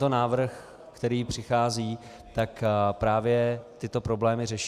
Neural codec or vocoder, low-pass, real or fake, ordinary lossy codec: vocoder, 44.1 kHz, 128 mel bands every 256 samples, BigVGAN v2; 14.4 kHz; fake; Opus, 64 kbps